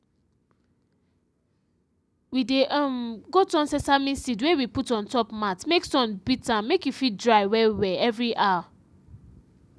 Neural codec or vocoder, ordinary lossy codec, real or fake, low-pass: none; none; real; none